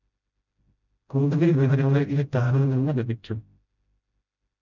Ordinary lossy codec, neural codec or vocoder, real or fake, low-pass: none; codec, 16 kHz, 0.5 kbps, FreqCodec, smaller model; fake; 7.2 kHz